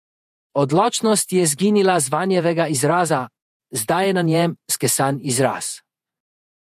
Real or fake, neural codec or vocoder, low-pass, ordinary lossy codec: fake; vocoder, 48 kHz, 128 mel bands, Vocos; 14.4 kHz; MP3, 64 kbps